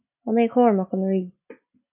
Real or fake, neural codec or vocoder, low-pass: real; none; 3.6 kHz